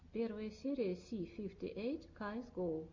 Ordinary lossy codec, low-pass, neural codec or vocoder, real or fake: MP3, 48 kbps; 7.2 kHz; none; real